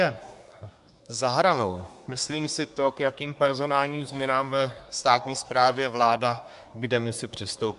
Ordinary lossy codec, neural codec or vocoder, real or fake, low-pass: AAC, 96 kbps; codec, 24 kHz, 1 kbps, SNAC; fake; 10.8 kHz